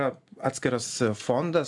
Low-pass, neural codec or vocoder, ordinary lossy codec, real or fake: 10.8 kHz; none; AAC, 64 kbps; real